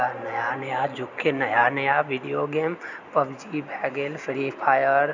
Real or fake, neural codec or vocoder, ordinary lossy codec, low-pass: real; none; none; 7.2 kHz